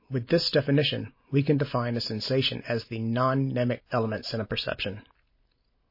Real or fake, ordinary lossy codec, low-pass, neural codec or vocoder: real; MP3, 24 kbps; 5.4 kHz; none